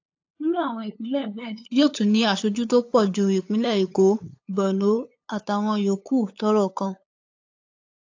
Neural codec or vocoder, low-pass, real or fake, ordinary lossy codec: codec, 16 kHz, 8 kbps, FunCodec, trained on LibriTTS, 25 frames a second; 7.2 kHz; fake; AAC, 48 kbps